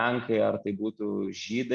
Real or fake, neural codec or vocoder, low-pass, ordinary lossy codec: real; none; 9.9 kHz; Opus, 64 kbps